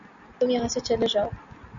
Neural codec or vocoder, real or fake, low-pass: none; real; 7.2 kHz